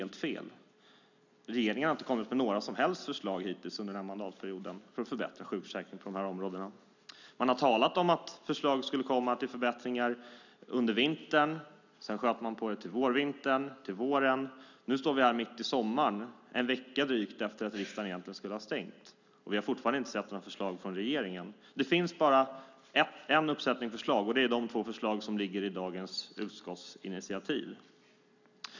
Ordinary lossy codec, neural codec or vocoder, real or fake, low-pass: none; none; real; 7.2 kHz